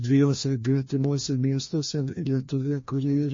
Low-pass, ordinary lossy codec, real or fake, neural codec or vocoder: 7.2 kHz; MP3, 32 kbps; fake; codec, 16 kHz, 1 kbps, FreqCodec, larger model